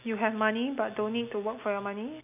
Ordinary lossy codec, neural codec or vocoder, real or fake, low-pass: none; none; real; 3.6 kHz